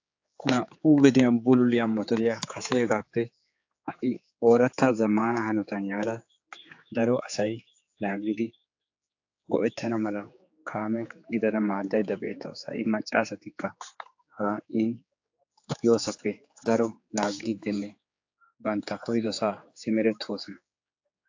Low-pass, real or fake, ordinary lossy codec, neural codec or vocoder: 7.2 kHz; fake; AAC, 48 kbps; codec, 16 kHz, 4 kbps, X-Codec, HuBERT features, trained on general audio